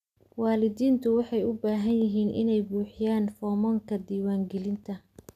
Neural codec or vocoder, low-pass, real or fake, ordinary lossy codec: none; 14.4 kHz; real; none